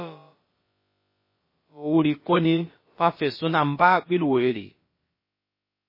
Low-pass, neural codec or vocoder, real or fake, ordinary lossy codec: 5.4 kHz; codec, 16 kHz, about 1 kbps, DyCAST, with the encoder's durations; fake; MP3, 24 kbps